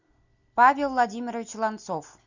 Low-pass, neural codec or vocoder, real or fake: 7.2 kHz; none; real